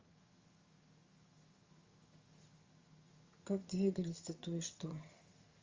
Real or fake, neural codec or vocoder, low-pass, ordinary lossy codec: fake; vocoder, 22.05 kHz, 80 mel bands, HiFi-GAN; 7.2 kHz; Opus, 32 kbps